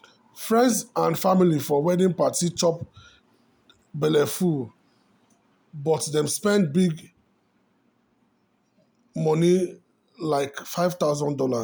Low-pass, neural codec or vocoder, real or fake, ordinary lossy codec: none; none; real; none